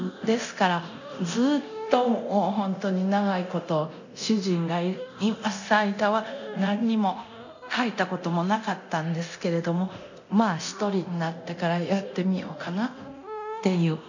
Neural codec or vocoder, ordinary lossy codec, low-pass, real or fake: codec, 24 kHz, 0.9 kbps, DualCodec; none; 7.2 kHz; fake